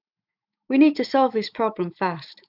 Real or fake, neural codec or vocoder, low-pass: fake; autoencoder, 48 kHz, 128 numbers a frame, DAC-VAE, trained on Japanese speech; 5.4 kHz